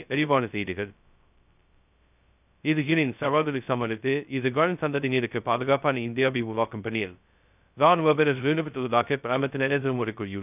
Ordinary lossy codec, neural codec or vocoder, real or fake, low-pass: none; codec, 16 kHz, 0.2 kbps, FocalCodec; fake; 3.6 kHz